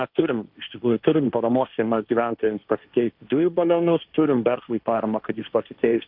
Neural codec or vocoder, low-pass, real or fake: codec, 16 kHz, 1.1 kbps, Voila-Tokenizer; 5.4 kHz; fake